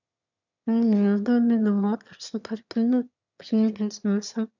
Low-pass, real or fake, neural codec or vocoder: 7.2 kHz; fake; autoencoder, 22.05 kHz, a latent of 192 numbers a frame, VITS, trained on one speaker